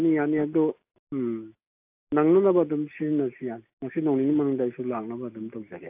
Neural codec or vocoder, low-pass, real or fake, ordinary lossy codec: none; 3.6 kHz; real; AAC, 32 kbps